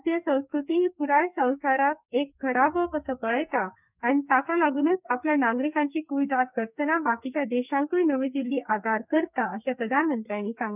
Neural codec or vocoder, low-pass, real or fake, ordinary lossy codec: codec, 44.1 kHz, 2.6 kbps, SNAC; 3.6 kHz; fake; none